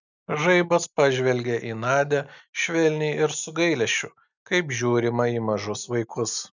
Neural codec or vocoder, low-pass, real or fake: none; 7.2 kHz; real